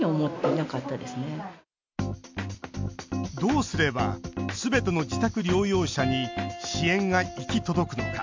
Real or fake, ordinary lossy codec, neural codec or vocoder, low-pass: real; MP3, 64 kbps; none; 7.2 kHz